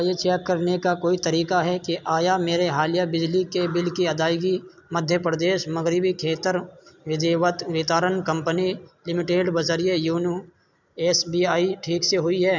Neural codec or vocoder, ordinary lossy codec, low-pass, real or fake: none; none; 7.2 kHz; real